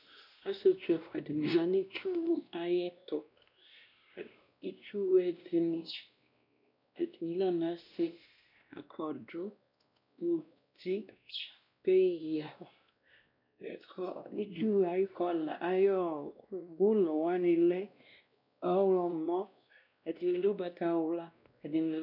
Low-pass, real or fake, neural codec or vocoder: 5.4 kHz; fake; codec, 16 kHz, 1 kbps, X-Codec, WavLM features, trained on Multilingual LibriSpeech